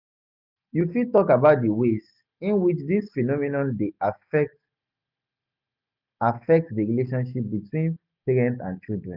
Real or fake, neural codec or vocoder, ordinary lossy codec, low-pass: real; none; none; 5.4 kHz